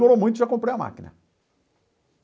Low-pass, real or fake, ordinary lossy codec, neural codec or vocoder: none; real; none; none